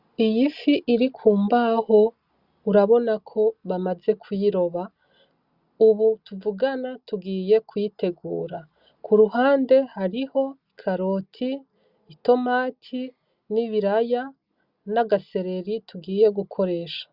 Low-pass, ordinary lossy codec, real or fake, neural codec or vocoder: 5.4 kHz; Opus, 64 kbps; real; none